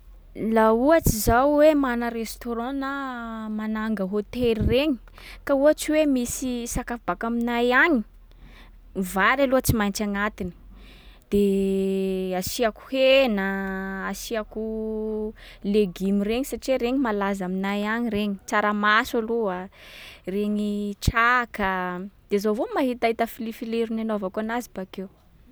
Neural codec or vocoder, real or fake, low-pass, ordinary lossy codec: none; real; none; none